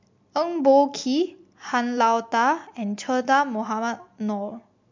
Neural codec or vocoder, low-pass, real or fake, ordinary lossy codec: none; 7.2 kHz; real; MP3, 48 kbps